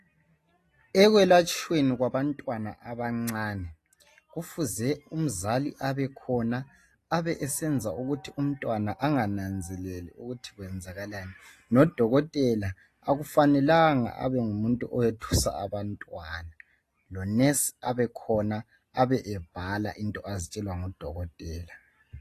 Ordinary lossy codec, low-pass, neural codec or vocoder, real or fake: AAC, 48 kbps; 14.4 kHz; none; real